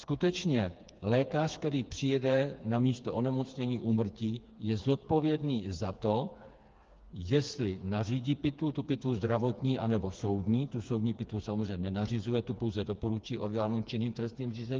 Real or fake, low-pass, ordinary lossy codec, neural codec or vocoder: fake; 7.2 kHz; Opus, 24 kbps; codec, 16 kHz, 4 kbps, FreqCodec, smaller model